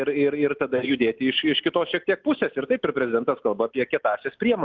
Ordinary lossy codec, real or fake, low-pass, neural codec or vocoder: Opus, 32 kbps; real; 7.2 kHz; none